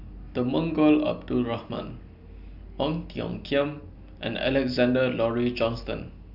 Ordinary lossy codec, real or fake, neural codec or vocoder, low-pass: none; real; none; 5.4 kHz